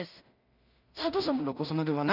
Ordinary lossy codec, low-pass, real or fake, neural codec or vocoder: none; 5.4 kHz; fake; codec, 16 kHz in and 24 kHz out, 0.4 kbps, LongCat-Audio-Codec, two codebook decoder